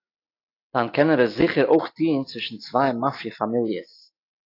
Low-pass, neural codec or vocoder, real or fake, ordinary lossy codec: 5.4 kHz; none; real; AAC, 32 kbps